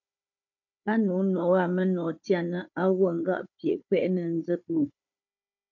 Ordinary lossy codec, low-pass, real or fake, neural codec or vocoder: MP3, 48 kbps; 7.2 kHz; fake; codec, 16 kHz, 4 kbps, FunCodec, trained on Chinese and English, 50 frames a second